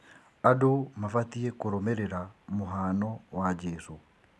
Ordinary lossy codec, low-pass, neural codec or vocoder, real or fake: none; none; none; real